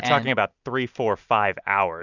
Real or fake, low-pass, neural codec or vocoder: real; 7.2 kHz; none